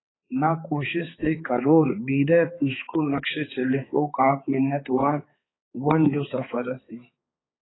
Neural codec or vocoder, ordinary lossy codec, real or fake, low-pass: codec, 16 kHz, 4 kbps, X-Codec, HuBERT features, trained on balanced general audio; AAC, 16 kbps; fake; 7.2 kHz